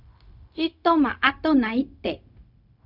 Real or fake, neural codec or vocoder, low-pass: fake; codec, 16 kHz, 0.4 kbps, LongCat-Audio-Codec; 5.4 kHz